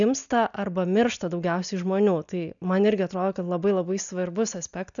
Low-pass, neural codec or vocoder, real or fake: 7.2 kHz; none; real